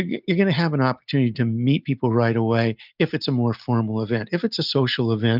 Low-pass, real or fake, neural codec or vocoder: 5.4 kHz; real; none